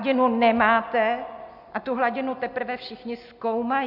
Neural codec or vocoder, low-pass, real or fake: none; 5.4 kHz; real